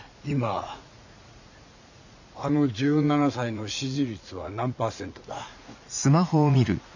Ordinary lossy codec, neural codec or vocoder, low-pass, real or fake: none; vocoder, 44.1 kHz, 80 mel bands, Vocos; 7.2 kHz; fake